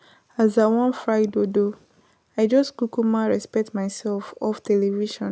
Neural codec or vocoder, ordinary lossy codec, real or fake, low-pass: none; none; real; none